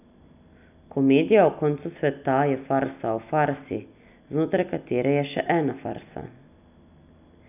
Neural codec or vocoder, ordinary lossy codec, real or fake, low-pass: none; none; real; 3.6 kHz